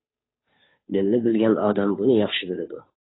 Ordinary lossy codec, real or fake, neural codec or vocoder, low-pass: AAC, 16 kbps; fake; codec, 16 kHz, 2 kbps, FunCodec, trained on Chinese and English, 25 frames a second; 7.2 kHz